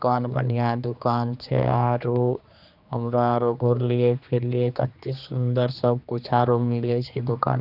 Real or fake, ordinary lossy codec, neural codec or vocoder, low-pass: fake; none; codec, 16 kHz, 2 kbps, X-Codec, HuBERT features, trained on general audio; 5.4 kHz